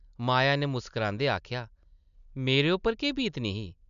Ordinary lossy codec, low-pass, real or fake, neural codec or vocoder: none; 7.2 kHz; real; none